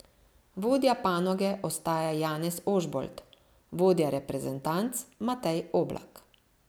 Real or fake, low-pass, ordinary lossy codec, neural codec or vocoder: real; none; none; none